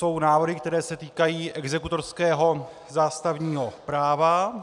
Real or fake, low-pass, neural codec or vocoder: real; 10.8 kHz; none